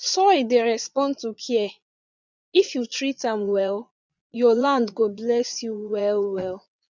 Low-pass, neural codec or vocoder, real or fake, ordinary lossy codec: 7.2 kHz; vocoder, 22.05 kHz, 80 mel bands, Vocos; fake; none